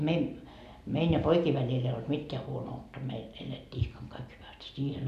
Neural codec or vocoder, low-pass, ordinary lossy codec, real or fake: none; 14.4 kHz; none; real